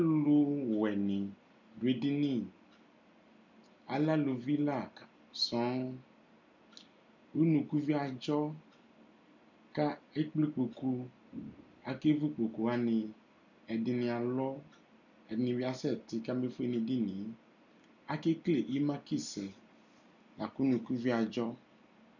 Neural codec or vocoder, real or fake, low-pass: none; real; 7.2 kHz